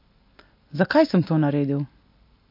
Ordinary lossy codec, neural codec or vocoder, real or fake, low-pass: MP3, 32 kbps; none; real; 5.4 kHz